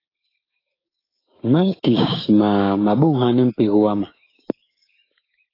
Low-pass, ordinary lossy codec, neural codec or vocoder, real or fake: 5.4 kHz; AAC, 24 kbps; codec, 16 kHz, 6 kbps, DAC; fake